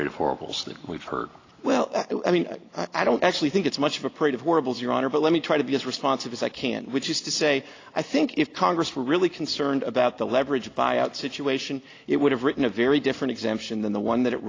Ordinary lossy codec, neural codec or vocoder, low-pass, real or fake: AAC, 32 kbps; none; 7.2 kHz; real